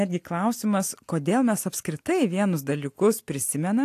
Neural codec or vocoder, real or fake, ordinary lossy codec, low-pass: none; real; AAC, 64 kbps; 14.4 kHz